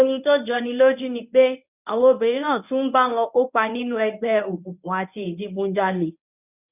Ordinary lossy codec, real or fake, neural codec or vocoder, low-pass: none; fake; codec, 24 kHz, 0.9 kbps, WavTokenizer, medium speech release version 1; 3.6 kHz